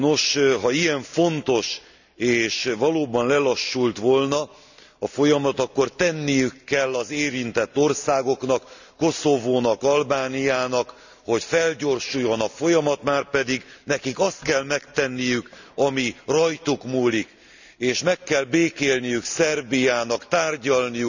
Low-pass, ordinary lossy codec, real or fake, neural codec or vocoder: 7.2 kHz; none; real; none